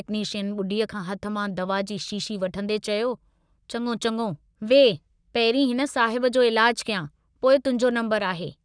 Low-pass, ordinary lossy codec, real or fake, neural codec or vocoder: 9.9 kHz; none; fake; codec, 44.1 kHz, 7.8 kbps, Pupu-Codec